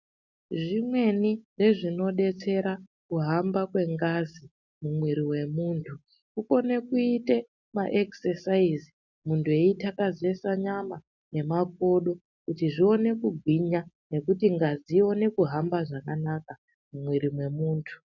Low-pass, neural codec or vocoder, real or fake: 7.2 kHz; none; real